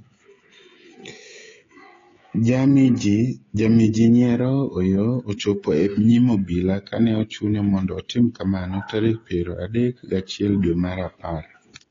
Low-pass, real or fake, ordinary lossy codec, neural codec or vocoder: 7.2 kHz; fake; AAC, 32 kbps; codec, 16 kHz, 16 kbps, FreqCodec, smaller model